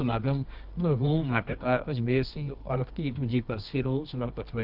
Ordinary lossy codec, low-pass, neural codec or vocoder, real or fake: Opus, 24 kbps; 5.4 kHz; codec, 24 kHz, 0.9 kbps, WavTokenizer, medium music audio release; fake